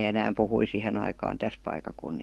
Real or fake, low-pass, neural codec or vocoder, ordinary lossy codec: fake; 19.8 kHz; codec, 44.1 kHz, 7.8 kbps, DAC; Opus, 16 kbps